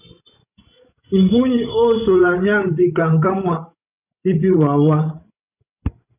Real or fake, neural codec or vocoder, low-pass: fake; codec, 16 kHz, 16 kbps, FreqCodec, larger model; 3.6 kHz